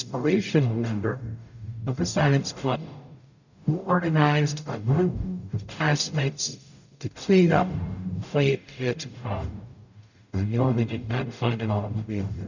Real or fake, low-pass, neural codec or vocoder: fake; 7.2 kHz; codec, 44.1 kHz, 0.9 kbps, DAC